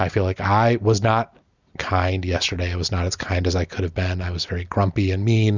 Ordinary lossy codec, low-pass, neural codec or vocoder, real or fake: Opus, 64 kbps; 7.2 kHz; none; real